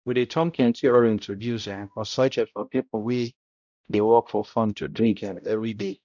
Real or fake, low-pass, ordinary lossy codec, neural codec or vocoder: fake; 7.2 kHz; none; codec, 16 kHz, 0.5 kbps, X-Codec, HuBERT features, trained on balanced general audio